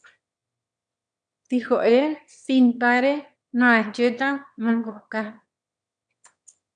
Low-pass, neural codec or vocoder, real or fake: 9.9 kHz; autoencoder, 22.05 kHz, a latent of 192 numbers a frame, VITS, trained on one speaker; fake